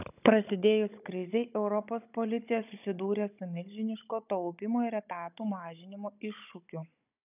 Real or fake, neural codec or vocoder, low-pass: fake; codec, 16 kHz, 16 kbps, FunCodec, trained on Chinese and English, 50 frames a second; 3.6 kHz